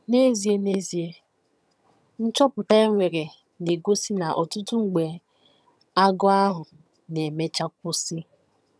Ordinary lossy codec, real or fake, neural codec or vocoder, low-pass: none; fake; vocoder, 22.05 kHz, 80 mel bands, HiFi-GAN; none